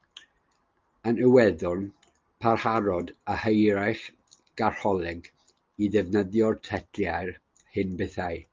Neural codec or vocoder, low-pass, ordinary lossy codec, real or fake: none; 7.2 kHz; Opus, 24 kbps; real